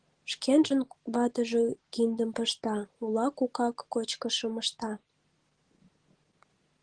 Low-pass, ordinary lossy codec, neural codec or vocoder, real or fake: 9.9 kHz; Opus, 16 kbps; none; real